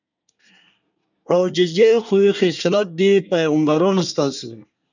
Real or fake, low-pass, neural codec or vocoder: fake; 7.2 kHz; codec, 24 kHz, 1 kbps, SNAC